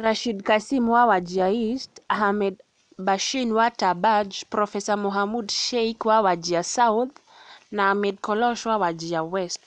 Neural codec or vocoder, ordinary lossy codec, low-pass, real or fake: none; none; 9.9 kHz; real